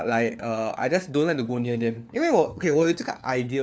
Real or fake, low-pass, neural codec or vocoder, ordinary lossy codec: fake; none; codec, 16 kHz, 4 kbps, FunCodec, trained on LibriTTS, 50 frames a second; none